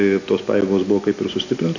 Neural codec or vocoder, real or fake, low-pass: none; real; 7.2 kHz